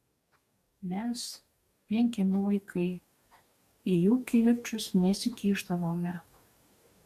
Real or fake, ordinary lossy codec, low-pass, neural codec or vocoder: fake; AAC, 96 kbps; 14.4 kHz; codec, 44.1 kHz, 2.6 kbps, DAC